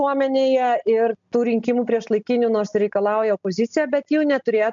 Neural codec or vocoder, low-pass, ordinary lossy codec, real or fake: none; 7.2 kHz; MP3, 64 kbps; real